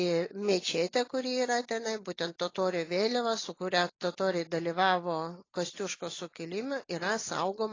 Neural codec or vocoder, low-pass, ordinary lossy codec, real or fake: none; 7.2 kHz; AAC, 32 kbps; real